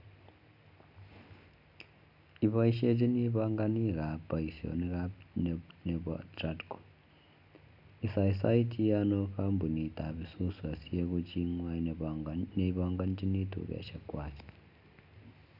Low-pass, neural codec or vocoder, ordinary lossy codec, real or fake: 5.4 kHz; none; none; real